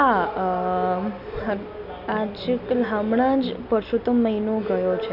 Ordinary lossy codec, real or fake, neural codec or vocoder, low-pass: none; real; none; 5.4 kHz